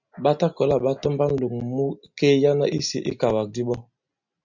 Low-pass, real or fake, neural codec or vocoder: 7.2 kHz; real; none